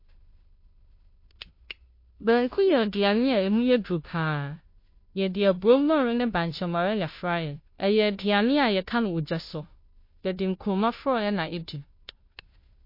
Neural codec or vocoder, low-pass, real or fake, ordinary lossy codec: codec, 16 kHz, 0.5 kbps, FunCodec, trained on Chinese and English, 25 frames a second; 5.4 kHz; fake; MP3, 32 kbps